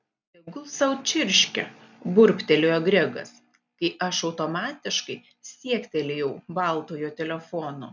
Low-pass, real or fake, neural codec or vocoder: 7.2 kHz; real; none